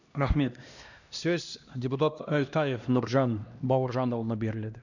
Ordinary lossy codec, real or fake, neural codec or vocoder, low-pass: none; fake; codec, 16 kHz, 1 kbps, X-Codec, HuBERT features, trained on LibriSpeech; 7.2 kHz